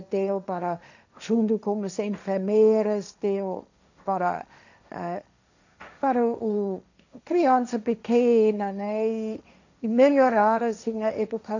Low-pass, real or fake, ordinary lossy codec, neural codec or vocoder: 7.2 kHz; fake; none; codec, 16 kHz, 1.1 kbps, Voila-Tokenizer